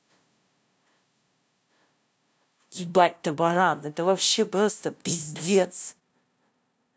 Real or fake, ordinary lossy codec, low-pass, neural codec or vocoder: fake; none; none; codec, 16 kHz, 0.5 kbps, FunCodec, trained on LibriTTS, 25 frames a second